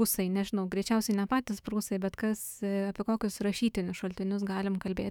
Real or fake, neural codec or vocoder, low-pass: fake; autoencoder, 48 kHz, 128 numbers a frame, DAC-VAE, trained on Japanese speech; 19.8 kHz